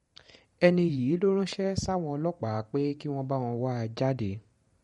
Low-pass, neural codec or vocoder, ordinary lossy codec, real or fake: 19.8 kHz; vocoder, 44.1 kHz, 128 mel bands every 512 samples, BigVGAN v2; MP3, 48 kbps; fake